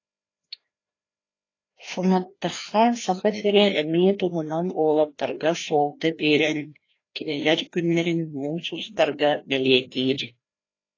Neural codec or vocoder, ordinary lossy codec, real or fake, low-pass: codec, 16 kHz, 1 kbps, FreqCodec, larger model; AAC, 32 kbps; fake; 7.2 kHz